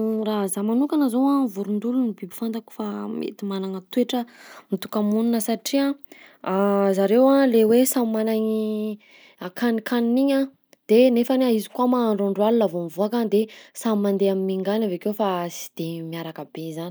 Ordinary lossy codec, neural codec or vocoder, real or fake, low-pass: none; none; real; none